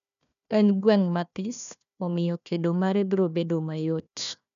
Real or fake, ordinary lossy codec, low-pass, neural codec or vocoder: fake; none; 7.2 kHz; codec, 16 kHz, 1 kbps, FunCodec, trained on Chinese and English, 50 frames a second